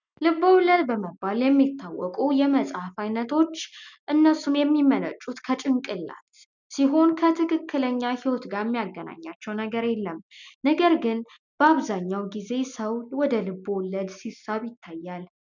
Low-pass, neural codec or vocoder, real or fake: 7.2 kHz; none; real